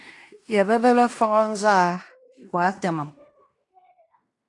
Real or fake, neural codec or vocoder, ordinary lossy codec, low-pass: fake; codec, 16 kHz in and 24 kHz out, 0.9 kbps, LongCat-Audio-Codec, fine tuned four codebook decoder; AAC, 48 kbps; 10.8 kHz